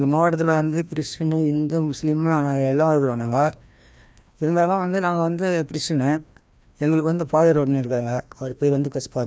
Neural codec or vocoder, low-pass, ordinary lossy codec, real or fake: codec, 16 kHz, 1 kbps, FreqCodec, larger model; none; none; fake